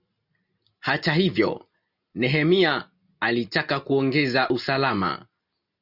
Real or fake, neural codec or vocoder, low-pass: real; none; 5.4 kHz